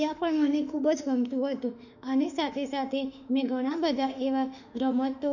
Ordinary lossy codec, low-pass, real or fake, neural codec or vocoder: none; 7.2 kHz; fake; autoencoder, 48 kHz, 32 numbers a frame, DAC-VAE, trained on Japanese speech